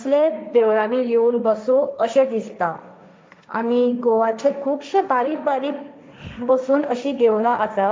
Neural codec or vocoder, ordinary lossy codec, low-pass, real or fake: codec, 16 kHz, 1.1 kbps, Voila-Tokenizer; none; none; fake